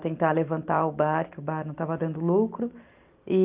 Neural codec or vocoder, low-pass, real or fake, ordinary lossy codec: none; 3.6 kHz; real; Opus, 32 kbps